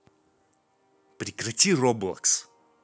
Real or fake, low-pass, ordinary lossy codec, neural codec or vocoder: real; none; none; none